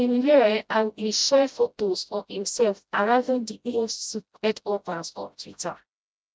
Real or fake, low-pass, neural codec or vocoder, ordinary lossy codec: fake; none; codec, 16 kHz, 0.5 kbps, FreqCodec, smaller model; none